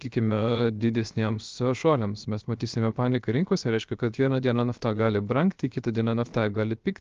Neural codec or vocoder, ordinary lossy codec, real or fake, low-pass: codec, 16 kHz, 0.7 kbps, FocalCodec; Opus, 32 kbps; fake; 7.2 kHz